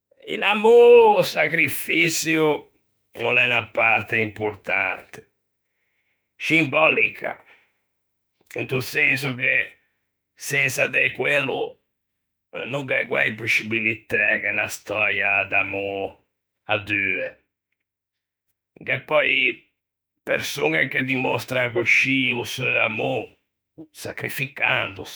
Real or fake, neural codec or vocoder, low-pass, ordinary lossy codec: fake; autoencoder, 48 kHz, 32 numbers a frame, DAC-VAE, trained on Japanese speech; none; none